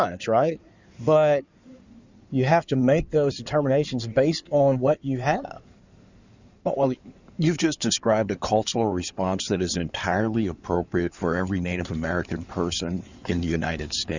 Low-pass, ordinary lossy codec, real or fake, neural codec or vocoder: 7.2 kHz; Opus, 64 kbps; fake; codec, 16 kHz in and 24 kHz out, 2.2 kbps, FireRedTTS-2 codec